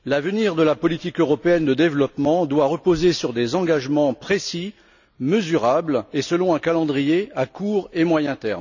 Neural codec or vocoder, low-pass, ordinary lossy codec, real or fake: none; 7.2 kHz; none; real